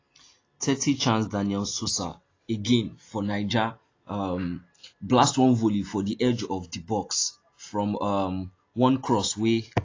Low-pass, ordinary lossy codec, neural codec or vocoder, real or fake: 7.2 kHz; AAC, 32 kbps; none; real